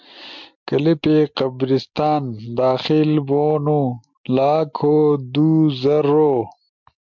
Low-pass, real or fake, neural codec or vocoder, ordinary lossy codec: 7.2 kHz; real; none; MP3, 64 kbps